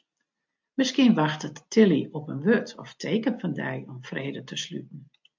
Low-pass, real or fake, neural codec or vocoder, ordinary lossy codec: 7.2 kHz; real; none; MP3, 64 kbps